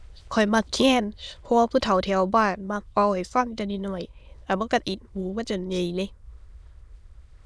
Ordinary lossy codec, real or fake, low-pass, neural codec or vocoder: none; fake; none; autoencoder, 22.05 kHz, a latent of 192 numbers a frame, VITS, trained on many speakers